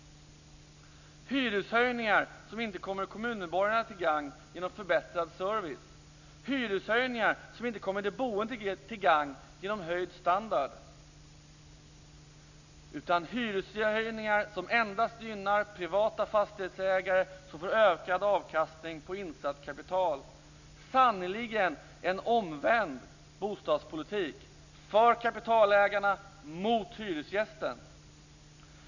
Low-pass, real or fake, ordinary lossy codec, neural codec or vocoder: 7.2 kHz; real; none; none